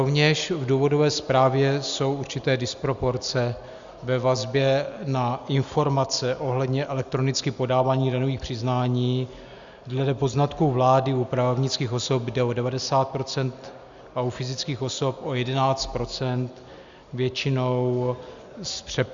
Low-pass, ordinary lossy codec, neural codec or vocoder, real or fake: 7.2 kHz; Opus, 64 kbps; none; real